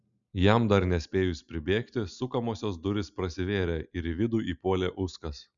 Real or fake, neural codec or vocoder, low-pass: real; none; 7.2 kHz